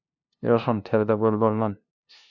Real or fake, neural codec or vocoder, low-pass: fake; codec, 16 kHz, 0.5 kbps, FunCodec, trained on LibriTTS, 25 frames a second; 7.2 kHz